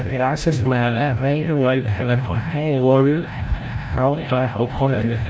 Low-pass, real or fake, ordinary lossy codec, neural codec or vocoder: none; fake; none; codec, 16 kHz, 0.5 kbps, FreqCodec, larger model